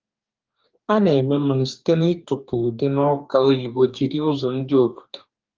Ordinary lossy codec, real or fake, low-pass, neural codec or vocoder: Opus, 32 kbps; fake; 7.2 kHz; codec, 44.1 kHz, 2.6 kbps, DAC